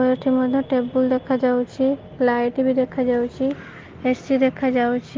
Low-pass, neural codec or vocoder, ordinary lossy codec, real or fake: 7.2 kHz; none; Opus, 16 kbps; real